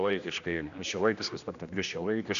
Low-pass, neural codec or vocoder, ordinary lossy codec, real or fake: 7.2 kHz; codec, 16 kHz, 1 kbps, X-Codec, HuBERT features, trained on general audio; AAC, 96 kbps; fake